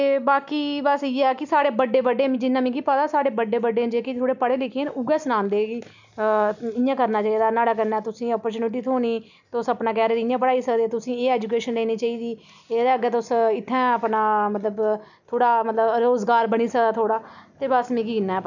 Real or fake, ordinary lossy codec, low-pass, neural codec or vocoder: real; none; 7.2 kHz; none